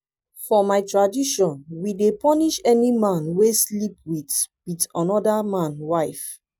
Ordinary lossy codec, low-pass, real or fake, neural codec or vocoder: none; none; real; none